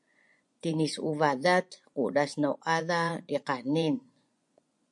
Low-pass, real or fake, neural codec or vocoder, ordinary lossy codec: 10.8 kHz; fake; vocoder, 24 kHz, 100 mel bands, Vocos; MP3, 64 kbps